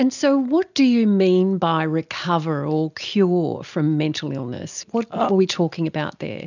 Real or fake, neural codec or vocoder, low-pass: real; none; 7.2 kHz